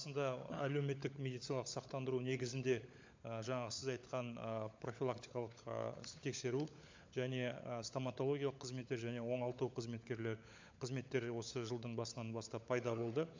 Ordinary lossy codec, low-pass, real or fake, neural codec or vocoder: MP3, 64 kbps; 7.2 kHz; fake; codec, 16 kHz, 16 kbps, FunCodec, trained on LibriTTS, 50 frames a second